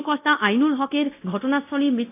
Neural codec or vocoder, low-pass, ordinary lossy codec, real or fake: codec, 24 kHz, 0.9 kbps, DualCodec; 3.6 kHz; AAC, 24 kbps; fake